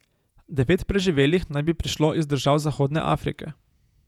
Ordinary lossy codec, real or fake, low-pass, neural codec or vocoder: none; fake; 19.8 kHz; vocoder, 48 kHz, 128 mel bands, Vocos